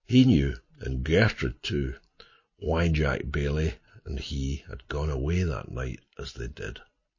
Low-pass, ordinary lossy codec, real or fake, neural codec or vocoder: 7.2 kHz; MP3, 32 kbps; real; none